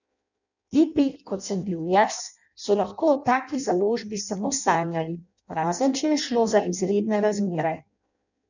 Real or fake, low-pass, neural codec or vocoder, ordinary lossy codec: fake; 7.2 kHz; codec, 16 kHz in and 24 kHz out, 0.6 kbps, FireRedTTS-2 codec; none